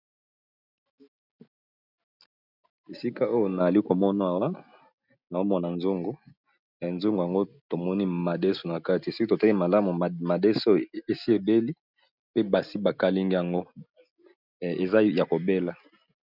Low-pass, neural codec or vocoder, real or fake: 5.4 kHz; none; real